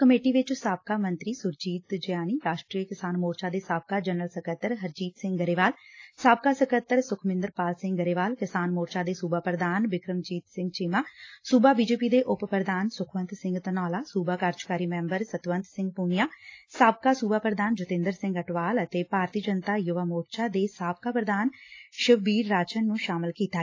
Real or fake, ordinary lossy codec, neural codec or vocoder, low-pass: real; AAC, 32 kbps; none; 7.2 kHz